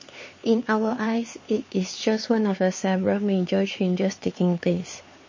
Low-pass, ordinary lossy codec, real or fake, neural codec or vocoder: 7.2 kHz; MP3, 32 kbps; fake; codec, 16 kHz, 4 kbps, X-Codec, HuBERT features, trained on LibriSpeech